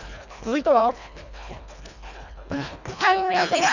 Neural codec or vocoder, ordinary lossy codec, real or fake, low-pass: codec, 24 kHz, 1.5 kbps, HILCodec; none; fake; 7.2 kHz